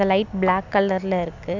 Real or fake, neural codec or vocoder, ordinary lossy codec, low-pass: real; none; none; 7.2 kHz